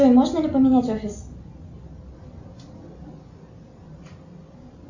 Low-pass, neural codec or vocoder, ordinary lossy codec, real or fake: 7.2 kHz; none; Opus, 64 kbps; real